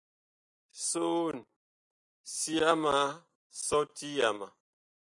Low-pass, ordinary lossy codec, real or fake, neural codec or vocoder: 10.8 kHz; MP3, 96 kbps; real; none